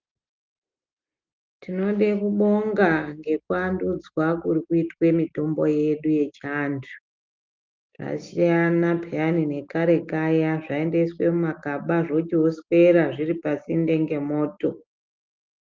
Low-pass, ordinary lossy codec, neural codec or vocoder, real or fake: 7.2 kHz; Opus, 32 kbps; none; real